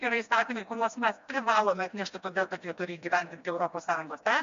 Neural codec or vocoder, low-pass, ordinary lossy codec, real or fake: codec, 16 kHz, 1 kbps, FreqCodec, smaller model; 7.2 kHz; MP3, 48 kbps; fake